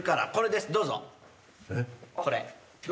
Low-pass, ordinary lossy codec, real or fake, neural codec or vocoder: none; none; real; none